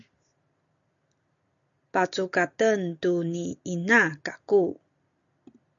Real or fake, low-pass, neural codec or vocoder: real; 7.2 kHz; none